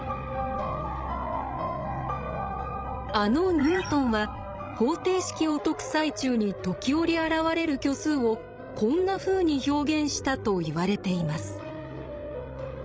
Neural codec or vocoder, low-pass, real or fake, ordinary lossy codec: codec, 16 kHz, 16 kbps, FreqCodec, larger model; none; fake; none